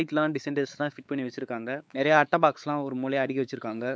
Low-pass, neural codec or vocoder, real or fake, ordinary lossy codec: none; codec, 16 kHz, 4 kbps, X-Codec, WavLM features, trained on Multilingual LibriSpeech; fake; none